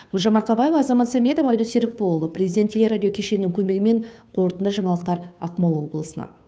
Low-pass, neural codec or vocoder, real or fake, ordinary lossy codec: none; codec, 16 kHz, 2 kbps, FunCodec, trained on Chinese and English, 25 frames a second; fake; none